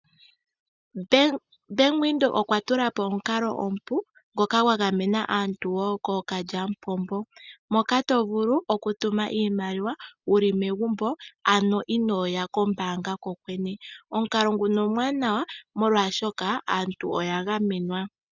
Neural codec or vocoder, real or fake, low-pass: none; real; 7.2 kHz